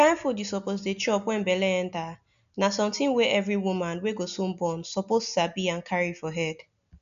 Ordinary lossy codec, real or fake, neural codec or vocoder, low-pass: none; real; none; 7.2 kHz